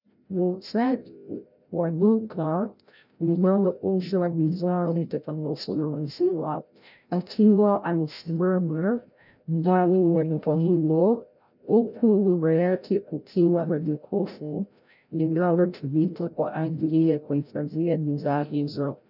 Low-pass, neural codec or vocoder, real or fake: 5.4 kHz; codec, 16 kHz, 0.5 kbps, FreqCodec, larger model; fake